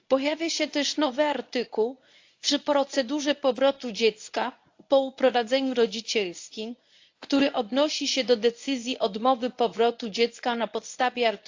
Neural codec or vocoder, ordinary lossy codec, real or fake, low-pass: codec, 24 kHz, 0.9 kbps, WavTokenizer, medium speech release version 1; AAC, 48 kbps; fake; 7.2 kHz